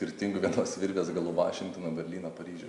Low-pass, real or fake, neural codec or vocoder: 10.8 kHz; real; none